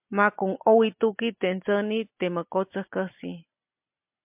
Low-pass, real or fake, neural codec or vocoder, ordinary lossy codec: 3.6 kHz; real; none; MP3, 32 kbps